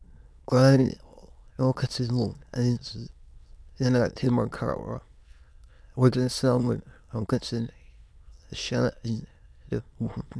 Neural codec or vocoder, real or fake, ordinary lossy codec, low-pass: autoencoder, 22.05 kHz, a latent of 192 numbers a frame, VITS, trained on many speakers; fake; none; none